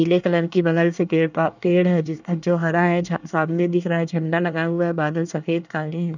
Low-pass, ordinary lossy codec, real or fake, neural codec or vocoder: 7.2 kHz; none; fake; codec, 24 kHz, 1 kbps, SNAC